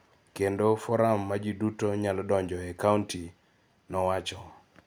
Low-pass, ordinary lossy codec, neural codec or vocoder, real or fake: none; none; none; real